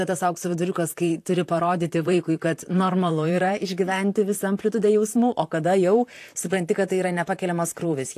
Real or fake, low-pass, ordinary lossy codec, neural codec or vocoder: fake; 14.4 kHz; AAC, 64 kbps; vocoder, 44.1 kHz, 128 mel bands, Pupu-Vocoder